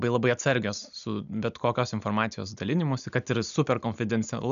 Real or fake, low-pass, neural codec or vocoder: real; 7.2 kHz; none